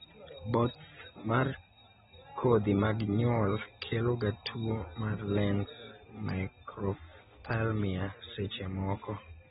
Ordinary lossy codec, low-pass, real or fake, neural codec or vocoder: AAC, 16 kbps; 7.2 kHz; real; none